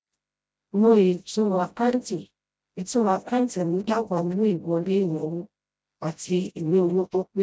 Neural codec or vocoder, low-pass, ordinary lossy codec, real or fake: codec, 16 kHz, 0.5 kbps, FreqCodec, smaller model; none; none; fake